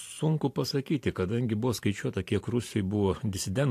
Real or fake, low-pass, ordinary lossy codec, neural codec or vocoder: fake; 14.4 kHz; AAC, 64 kbps; vocoder, 44.1 kHz, 128 mel bands every 512 samples, BigVGAN v2